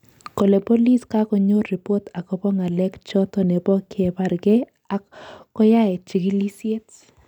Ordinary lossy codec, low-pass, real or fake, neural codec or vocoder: none; 19.8 kHz; real; none